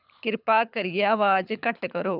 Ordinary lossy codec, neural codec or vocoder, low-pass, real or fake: none; codec, 24 kHz, 6 kbps, HILCodec; 5.4 kHz; fake